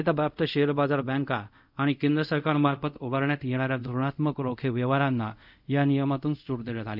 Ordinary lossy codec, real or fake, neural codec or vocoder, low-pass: none; fake; codec, 24 kHz, 0.5 kbps, DualCodec; 5.4 kHz